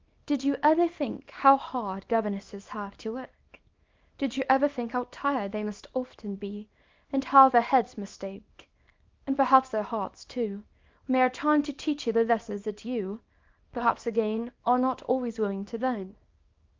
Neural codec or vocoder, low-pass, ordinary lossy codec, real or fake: codec, 24 kHz, 0.9 kbps, WavTokenizer, small release; 7.2 kHz; Opus, 24 kbps; fake